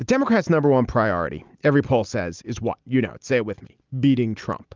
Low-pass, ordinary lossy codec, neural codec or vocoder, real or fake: 7.2 kHz; Opus, 32 kbps; none; real